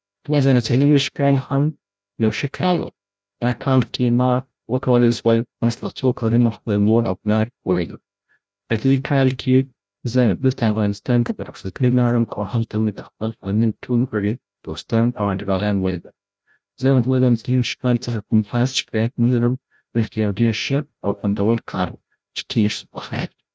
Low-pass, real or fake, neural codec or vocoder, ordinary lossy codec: none; fake; codec, 16 kHz, 0.5 kbps, FreqCodec, larger model; none